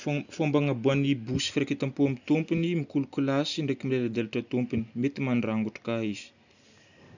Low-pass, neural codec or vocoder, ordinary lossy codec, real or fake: 7.2 kHz; none; none; real